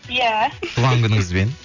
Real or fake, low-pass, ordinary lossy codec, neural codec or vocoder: real; 7.2 kHz; none; none